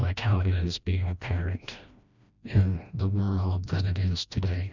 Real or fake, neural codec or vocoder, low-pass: fake; codec, 16 kHz, 1 kbps, FreqCodec, smaller model; 7.2 kHz